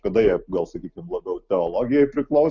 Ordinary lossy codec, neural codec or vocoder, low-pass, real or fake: Opus, 64 kbps; none; 7.2 kHz; real